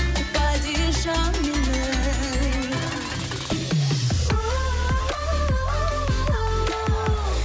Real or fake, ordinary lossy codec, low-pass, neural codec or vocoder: real; none; none; none